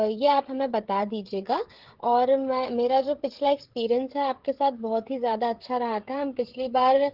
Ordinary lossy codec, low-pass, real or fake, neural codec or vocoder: Opus, 16 kbps; 5.4 kHz; fake; codec, 16 kHz, 16 kbps, FreqCodec, smaller model